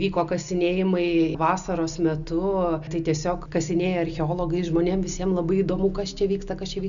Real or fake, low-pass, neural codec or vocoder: real; 7.2 kHz; none